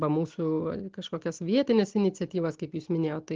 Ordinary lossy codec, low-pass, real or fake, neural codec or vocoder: Opus, 16 kbps; 7.2 kHz; real; none